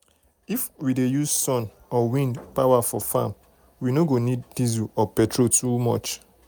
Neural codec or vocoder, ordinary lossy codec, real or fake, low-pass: none; none; real; none